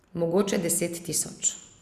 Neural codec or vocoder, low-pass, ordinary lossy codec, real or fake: none; 14.4 kHz; Opus, 64 kbps; real